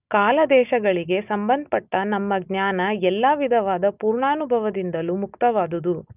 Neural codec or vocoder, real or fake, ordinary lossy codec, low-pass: none; real; none; 3.6 kHz